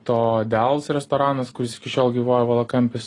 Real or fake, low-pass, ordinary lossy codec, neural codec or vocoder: real; 10.8 kHz; AAC, 32 kbps; none